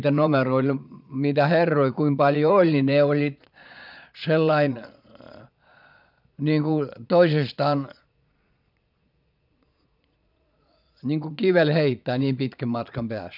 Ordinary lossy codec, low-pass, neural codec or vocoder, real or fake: none; 5.4 kHz; vocoder, 22.05 kHz, 80 mel bands, Vocos; fake